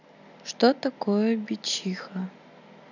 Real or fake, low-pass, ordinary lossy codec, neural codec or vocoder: real; 7.2 kHz; none; none